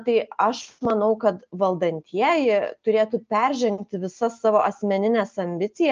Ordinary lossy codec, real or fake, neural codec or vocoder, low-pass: Opus, 24 kbps; real; none; 7.2 kHz